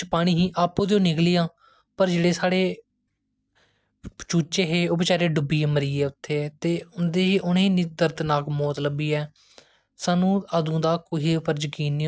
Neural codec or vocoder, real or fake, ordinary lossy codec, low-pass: none; real; none; none